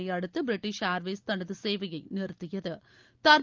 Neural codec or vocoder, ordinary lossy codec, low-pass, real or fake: none; Opus, 24 kbps; 7.2 kHz; real